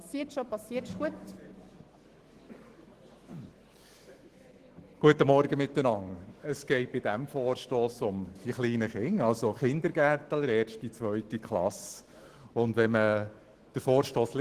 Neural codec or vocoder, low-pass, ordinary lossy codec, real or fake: autoencoder, 48 kHz, 128 numbers a frame, DAC-VAE, trained on Japanese speech; 14.4 kHz; Opus, 16 kbps; fake